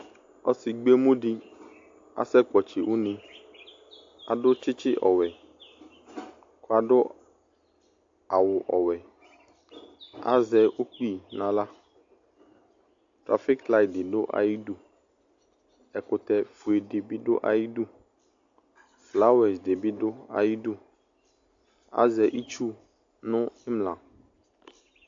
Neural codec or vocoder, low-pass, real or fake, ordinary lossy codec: none; 7.2 kHz; real; AAC, 48 kbps